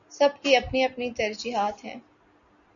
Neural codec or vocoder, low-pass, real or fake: none; 7.2 kHz; real